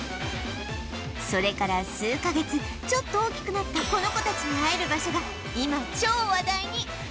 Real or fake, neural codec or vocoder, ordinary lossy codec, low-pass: real; none; none; none